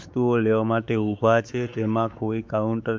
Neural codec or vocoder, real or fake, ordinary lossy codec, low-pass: codec, 16 kHz, 4 kbps, X-Codec, WavLM features, trained on Multilingual LibriSpeech; fake; none; none